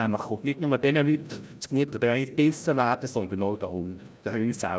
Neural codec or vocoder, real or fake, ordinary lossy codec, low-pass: codec, 16 kHz, 0.5 kbps, FreqCodec, larger model; fake; none; none